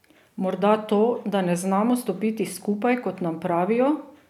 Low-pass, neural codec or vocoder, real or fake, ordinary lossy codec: 19.8 kHz; none; real; none